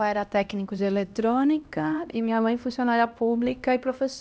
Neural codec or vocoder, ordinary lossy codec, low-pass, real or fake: codec, 16 kHz, 1 kbps, X-Codec, HuBERT features, trained on LibriSpeech; none; none; fake